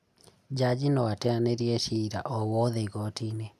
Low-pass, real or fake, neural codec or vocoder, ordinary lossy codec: 14.4 kHz; real; none; none